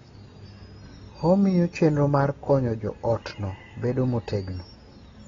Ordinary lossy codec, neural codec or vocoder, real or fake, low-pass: AAC, 24 kbps; none; real; 7.2 kHz